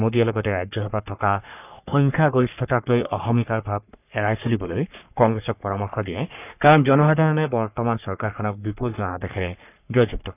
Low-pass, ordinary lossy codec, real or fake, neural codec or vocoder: 3.6 kHz; none; fake; codec, 44.1 kHz, 3.4 kbps, Pupu-Codec